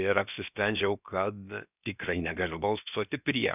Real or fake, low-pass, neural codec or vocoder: fake; 3.6 kHz; codec, 16 kHz, about 1 kbps, DyCAST, with the encoder's durations